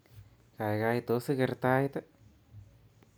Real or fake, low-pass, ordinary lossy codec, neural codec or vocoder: real; none; none; none